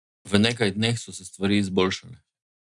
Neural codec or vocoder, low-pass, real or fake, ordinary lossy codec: none; 10.8 kHz; real; none